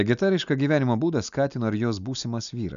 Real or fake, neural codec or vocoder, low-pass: real; none; 7.2 kHz